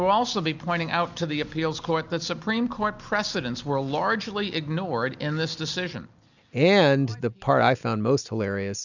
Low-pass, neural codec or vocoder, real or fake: 7.2 kHz; none; real